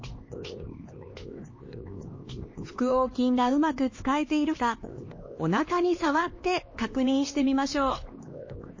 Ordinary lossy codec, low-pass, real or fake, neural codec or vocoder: MP3, 32 kbps; 7.2 kHz; fake; codec, 16 kHz, 2 kbps, X-Codec, HuBERT features, trained on LibriSpeech